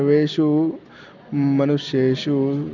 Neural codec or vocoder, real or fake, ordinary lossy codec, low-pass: none; real; none; 7.2 kHz